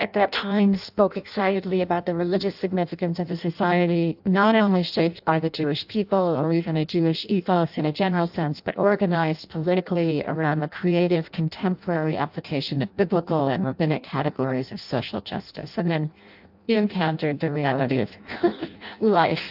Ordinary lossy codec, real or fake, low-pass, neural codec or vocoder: AAC, 48 kbps; fake; 5.4 kHz; codec, 16 kHz in and 24 kHz out, 0.6 kbps, FireRedTTS-2 codec